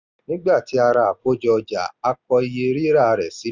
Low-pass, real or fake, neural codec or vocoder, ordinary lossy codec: 7.2 kHz; real; none; Opus, 64 kbps